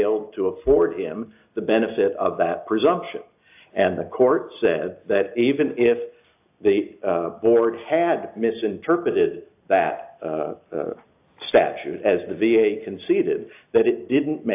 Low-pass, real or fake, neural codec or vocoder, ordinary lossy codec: 3.6 kHz; real; none; AAC, 32 kbps